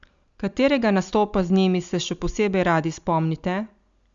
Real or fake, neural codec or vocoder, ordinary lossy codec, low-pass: real; none; Opus, 64 kbps; 7.2 kHz